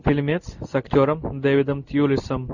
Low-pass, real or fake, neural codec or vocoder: 7.2 kHz; real; none